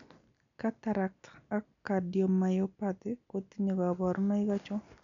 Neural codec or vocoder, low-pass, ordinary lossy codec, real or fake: none; 7.2 kHz; none; real